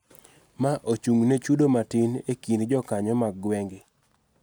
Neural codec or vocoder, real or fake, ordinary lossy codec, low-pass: none; real; none; none